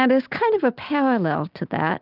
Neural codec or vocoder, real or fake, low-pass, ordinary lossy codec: none; real; 5.4 kHz; Opus, 24 kbps